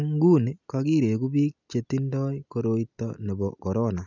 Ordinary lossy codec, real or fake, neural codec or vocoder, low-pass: none; real; none; 7.2 kHz